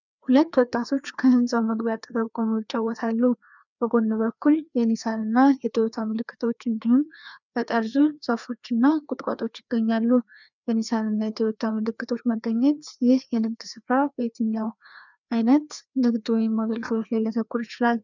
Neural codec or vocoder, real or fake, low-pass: codec, 16 kHz, 2 kbps, FreqCodec, larger model; fake; 7.2 kHz